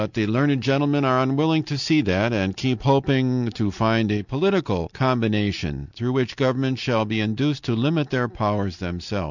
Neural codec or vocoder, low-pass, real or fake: none; 7.2 kHz; real